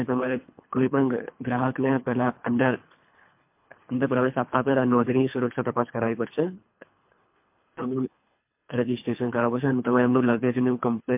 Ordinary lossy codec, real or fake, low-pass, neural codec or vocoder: MP3, 32 kbps; fake; 3.6 kHz; codec, 24 kHz, 3 kbps, HILCodec